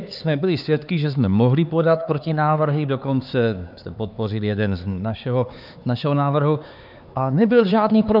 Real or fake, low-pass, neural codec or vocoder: fake; 5.4 kHz; codec, 16 kHz, 4 kbps, X-Codec, HuBERT features, trained on LibriSpeech